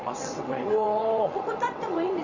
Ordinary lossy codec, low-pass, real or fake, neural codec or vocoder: none; 7.2 kHz; fake; vocoder, 22.05 kHz, 80 mel bands, WaveNeXt